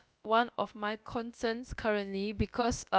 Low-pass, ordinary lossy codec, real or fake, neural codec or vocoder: none; none; fake; codec, 16 kHz, about 1 kbps, DyCAST, with the encoder's durations